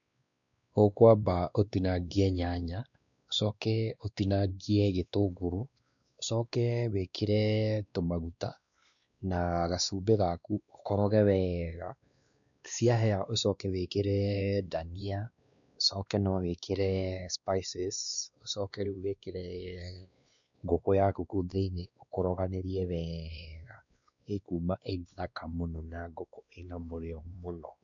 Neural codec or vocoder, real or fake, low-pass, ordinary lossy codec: codec, 16 kHz, 2 kbps, X-Codec, WavLM features, trained on Multilingual LibriSpeech; fake; 7.2 kHz; none